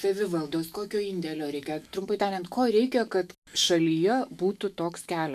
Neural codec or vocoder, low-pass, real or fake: autoencoder, 48 kHz, 128 numbers a frame, DAC-VAE, trained on Japanese speech; 14.4 kHz; fake